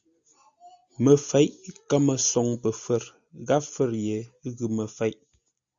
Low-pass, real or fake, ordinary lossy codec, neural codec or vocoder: 7.2 kHz; real; Opus, 64 kbps; none